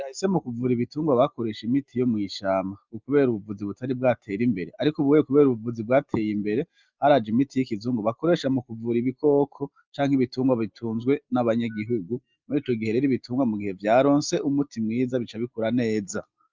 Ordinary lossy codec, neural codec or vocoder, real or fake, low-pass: Opus, 24 kbps; none; real; 7.2 kHz